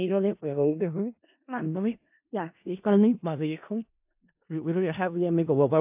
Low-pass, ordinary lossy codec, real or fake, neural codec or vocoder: 3.6 kHz; AAC, 32 kbps; fake; codec, 16 kHz in and 24 kHz out, 0.4 kbps, LongCat-Audio-Codec, four codebook decoder